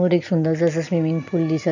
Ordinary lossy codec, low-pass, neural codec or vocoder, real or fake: none; 7.2 kHz; none; real